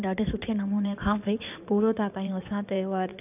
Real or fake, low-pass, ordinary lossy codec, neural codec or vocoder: fake; 3.6 kHz; none; codec, 16 kHz in and 24 kHz out, 2.2 kbps, FireRedTTS-2 codec